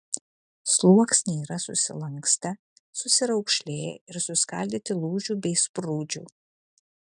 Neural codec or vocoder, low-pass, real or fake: none; 10.8 kHz; real